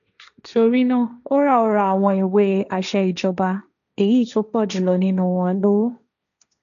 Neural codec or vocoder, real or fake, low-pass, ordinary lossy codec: codec, 16 kHz, 1.1 kbps, Voila-Tokenizer; fake; 7.2 kHz; none